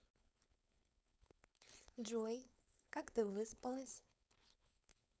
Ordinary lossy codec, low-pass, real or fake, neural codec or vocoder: none; none; fake; codec, 16 kHz, 4.8 kbps, FACodec